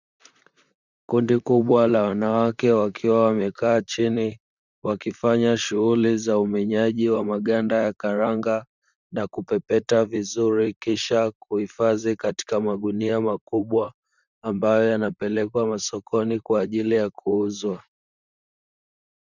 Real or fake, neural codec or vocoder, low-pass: fake; vocoder, 44.1 kHz, 128 mel bands, Pupu-Vocoder; 7.2 kHz